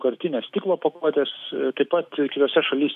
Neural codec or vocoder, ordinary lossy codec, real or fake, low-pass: autoencoder, 48 kHz, 128 numbers a frame, DAC-VAE, trained on Japanese speech; AAC, 96 kbps; fake; 14.4 kHz